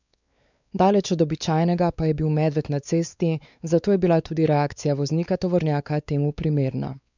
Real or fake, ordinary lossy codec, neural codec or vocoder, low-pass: fake; none; codec, 16 kHz, 4 kbps, X-Codec, WavLM features, trained on Multilingual LibriSpeech; 7.2 kHz